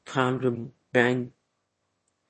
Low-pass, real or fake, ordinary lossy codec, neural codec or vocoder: 9.9 kHz; fake; MP3, 32 kbps; autoencoder, 22.05 kHz, a latent of 192 numbers a frame, VITS, trained on one speaker